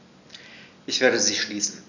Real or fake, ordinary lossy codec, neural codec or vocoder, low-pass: real; none; none; 7.2 kHz